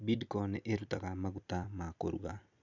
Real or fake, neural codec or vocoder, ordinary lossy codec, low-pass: fake; vocoder, 44.1 kHz, 128 mel bands every 256 samples, BigVGAN v2; none; 7.2 kHz